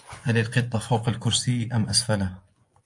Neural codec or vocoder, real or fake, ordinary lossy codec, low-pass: vocoder, 24 kHz, 100 mel bands, Vocos; fake; AAC, 64 kbps; 10.8 kHz